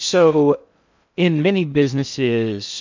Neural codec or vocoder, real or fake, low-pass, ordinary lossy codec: codec, 16 kHz in and 24 kHz out, 0.6 kbps, FocalCodec, streaming, 2048 codes; fake; 7.2 kHz; MP3, 64 kbps